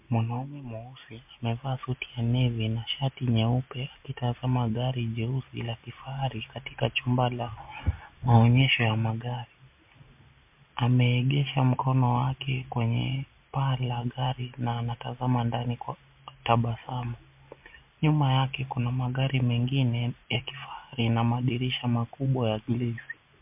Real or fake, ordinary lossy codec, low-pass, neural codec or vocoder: real; MP3, 32 kbps; 3.6 kHz; none